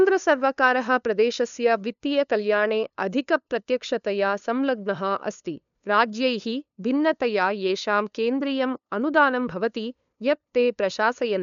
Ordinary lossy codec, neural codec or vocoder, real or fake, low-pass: none; codec, 16 kHz, 2 kbps, FunCodec, trained on LibriTTS, 25 frames a second; fake; 7.2 kHz